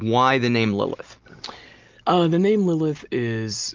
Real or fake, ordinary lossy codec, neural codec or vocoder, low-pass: real; Opus, 24 kbps; none; 7.2 kHz